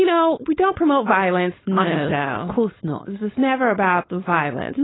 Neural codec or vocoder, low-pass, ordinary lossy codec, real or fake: codec, 16 kHz, 4.8 kbps, FACodec; 7.2 kHz; AAC, 16 kbps; fake